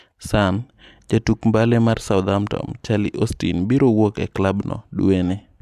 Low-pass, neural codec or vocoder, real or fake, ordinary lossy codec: 14.4 kHz; none; real; AAC, 96 kbps